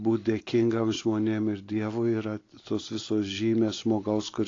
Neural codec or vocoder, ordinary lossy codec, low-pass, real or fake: none; AAC, 32 kbps; 7.2 kHz; real